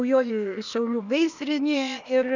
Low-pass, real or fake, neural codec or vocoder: 7.2 kHz; fake; codec, 16 kHz, 0.8 kbps, ZipCodec